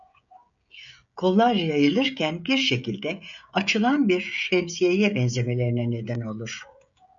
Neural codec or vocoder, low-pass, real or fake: codec, 16 kHz, 16 kbps, FreqCodec, smaller model; 7.2 kHz; fake